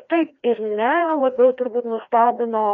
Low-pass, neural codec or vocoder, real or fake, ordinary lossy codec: 7.2 kHz; codec, 16 kHz, 1 kbps, FreqCodec, larger model; fake; MP3, 64 kbps